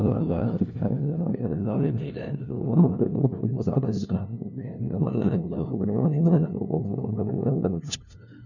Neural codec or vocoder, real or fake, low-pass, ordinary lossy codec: codec, 16 kHz, 0.5 kbps, FunCodec, trained on LibriTTS, 25 frames a second; fake; 7.2 kHz; none